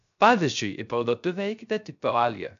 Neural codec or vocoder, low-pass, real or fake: codec, 16 kHz, 0.3 kbps, FocalCodec; 7.2 kHz; fake